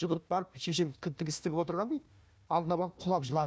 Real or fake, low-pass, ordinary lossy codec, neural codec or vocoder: fake; none; none; codec, 16 kHz, 1 kbps, FunCodec, trained on Chinese and English, 50 frames a second